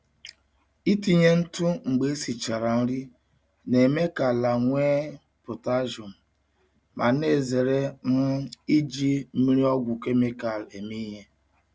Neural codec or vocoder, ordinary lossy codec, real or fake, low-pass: none; none; real; none